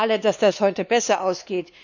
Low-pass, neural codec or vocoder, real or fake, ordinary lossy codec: 7.2 kHz; codec, 16 kHz, 2 kbps, X-Codec, WavLM features, trained on Multilingual LibriSpeech; fake; none